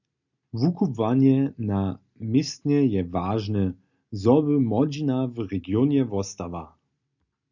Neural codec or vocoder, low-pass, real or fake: none; 7.2 kHz; real